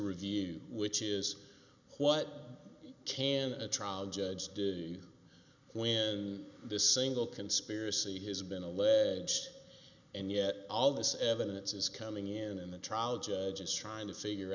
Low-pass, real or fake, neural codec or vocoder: 7.2 kHz; real; none